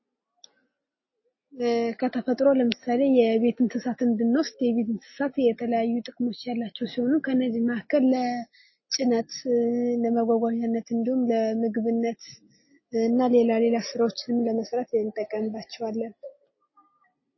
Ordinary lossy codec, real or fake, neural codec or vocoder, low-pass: MP3, 24 kbps; real; none; 7.2 kHz